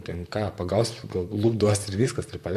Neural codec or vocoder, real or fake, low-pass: vocoder, 44.1 kHz, 128 mel bands, Pupu-Vocoder; fake; 14.4 kHz